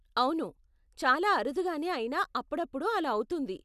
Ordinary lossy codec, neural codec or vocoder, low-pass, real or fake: none; none; 14.4 kHz; real